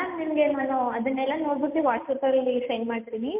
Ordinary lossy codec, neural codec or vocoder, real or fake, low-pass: MP3, 32 kbps; none; real; 3.6 kHz